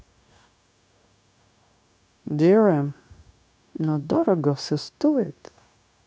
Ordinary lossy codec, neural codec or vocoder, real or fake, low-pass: none; codec, 16 kHz, 0.9 kbps, LongCat-Audio-Codec; fake; none